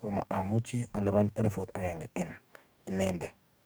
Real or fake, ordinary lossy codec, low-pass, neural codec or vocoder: fake; none; none; codec, 44.1 kHz, 2.6 kbps, DAC